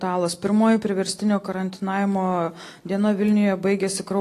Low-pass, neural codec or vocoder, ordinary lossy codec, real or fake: 14.4 kHz; none; AAC, 48 kbps; real